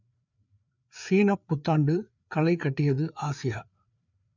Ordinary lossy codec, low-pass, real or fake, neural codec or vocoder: none; 7.2 kHz; fake; codec, 16 kHz, 4 kbps, FreqCodec, larger model